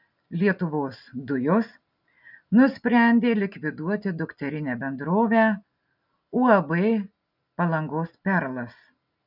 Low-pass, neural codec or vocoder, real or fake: 5.4 kHz; none; real